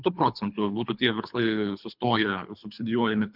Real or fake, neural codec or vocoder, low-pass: fake; codec, 24 kHz, 3 kbps, HILCodec; 5.4 kHz